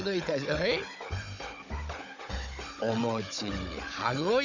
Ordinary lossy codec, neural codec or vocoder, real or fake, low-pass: none; codec, 16 kHz, 16 kbps, FunCodec, trained on Chinese and English, 50 frames a second; fake; 7.2 kHz